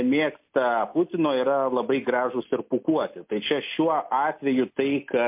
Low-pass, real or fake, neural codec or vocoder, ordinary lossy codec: 3.6 kHz; real; none; MP3, 32 kbps